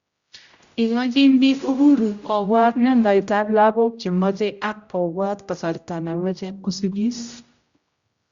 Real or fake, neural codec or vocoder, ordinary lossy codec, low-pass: fake; codec, 16 kHz, 0.5 kbps, X-Codec, HuBERT features, trained on general audio; Opus, 64 kbps; 7.2 kHz